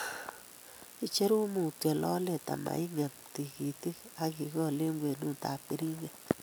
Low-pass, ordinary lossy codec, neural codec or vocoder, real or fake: none; none; none; real